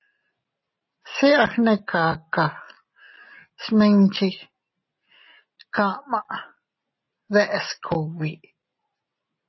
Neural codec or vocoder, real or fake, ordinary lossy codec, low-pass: none; real; MP3, 24 kbps; 7.2 kHz